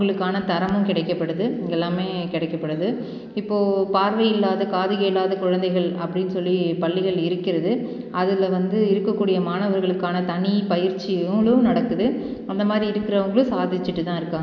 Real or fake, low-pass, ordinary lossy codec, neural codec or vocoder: real; 7.2 kHz; none; none